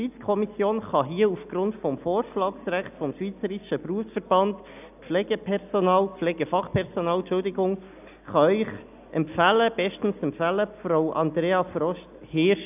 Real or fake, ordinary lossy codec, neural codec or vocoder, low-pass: real; none; none; 3.6 kHz